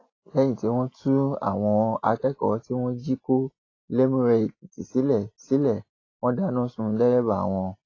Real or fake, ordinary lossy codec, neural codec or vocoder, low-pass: real; AAC, 32 kbps; none; 7.2 kHz